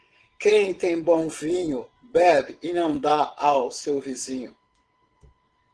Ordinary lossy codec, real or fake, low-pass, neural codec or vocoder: Opus, 16 kbps; fake; 9.9 kHz; vocoder, 22.05 kHz, 80 mel bands, WaveNeXt